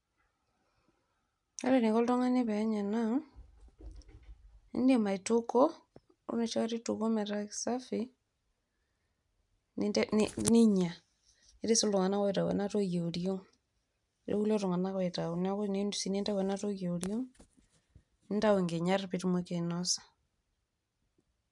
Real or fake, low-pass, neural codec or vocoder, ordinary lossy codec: real; 10.8 kHz; none; none